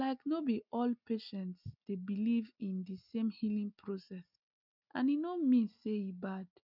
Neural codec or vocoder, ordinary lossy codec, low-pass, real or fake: none; none; 5.4 kHz; real